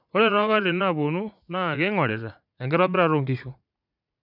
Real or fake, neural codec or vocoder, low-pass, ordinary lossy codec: fake; vocoder, 44.1 kHz, 80 mel bands, Vocos; 5.4 kHz; none